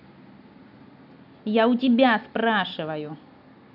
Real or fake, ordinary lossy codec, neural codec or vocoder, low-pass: real; none; none; 5.4 kHz